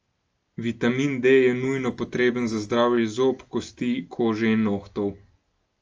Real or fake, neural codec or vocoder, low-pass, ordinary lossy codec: real; none; 7.2 kHz; Opus, 24 kbps